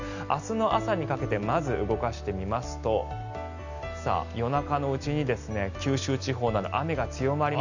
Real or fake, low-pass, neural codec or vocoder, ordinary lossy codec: real; 7.2 kHz; none; none